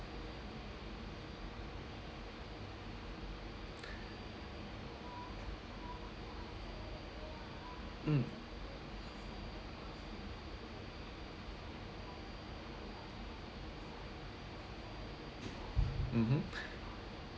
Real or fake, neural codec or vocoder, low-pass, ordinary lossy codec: real; none; none; none